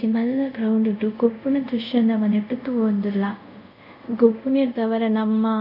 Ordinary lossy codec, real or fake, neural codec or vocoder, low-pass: none; fake; codec, 24 kHz, 0.5 kbps, DualCodec; 5.4 kHz